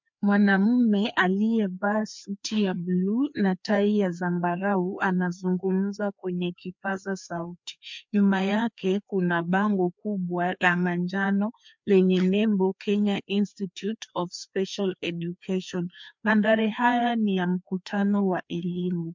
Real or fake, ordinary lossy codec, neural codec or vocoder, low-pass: fake; MP3, 64 kbps; codec, 16 kHz, 2 kbps, FreqCodec, larger model; 7.2 kHz